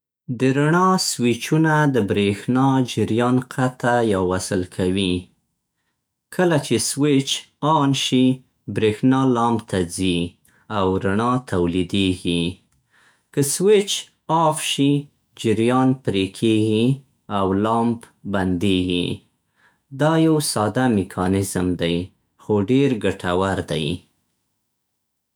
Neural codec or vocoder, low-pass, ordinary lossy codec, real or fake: autoencoder, 48 kHz, 128 numbers a frame, DAC-VAE, trained on Japanese speech; none; none; fake